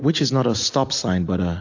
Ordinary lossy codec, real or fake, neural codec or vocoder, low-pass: AAC, 48 kbps; real; none; 7.2 kHz